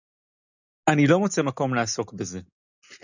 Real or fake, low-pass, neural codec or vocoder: real; 7.2 kHz; none